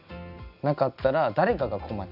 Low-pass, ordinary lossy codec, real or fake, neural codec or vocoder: 5.4 kHz; none; real; none